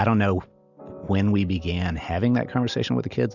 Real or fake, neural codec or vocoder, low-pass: real; none; 7.2 kHz